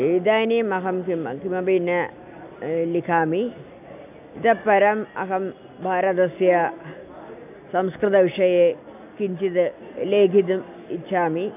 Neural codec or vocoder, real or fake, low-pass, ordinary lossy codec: none; real; 3.6 kHz; none